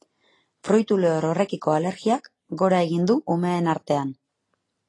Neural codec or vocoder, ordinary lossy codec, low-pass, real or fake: none; AAC, 32 kbps; 10.8 kHz; real